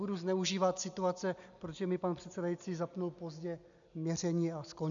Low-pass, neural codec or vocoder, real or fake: 7.2 kHz; none; real